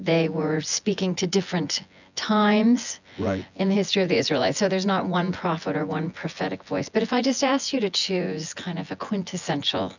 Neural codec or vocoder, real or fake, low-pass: vocoder, 24 kHz, 100 mel bands, Vocos; fake; 7.2 kHz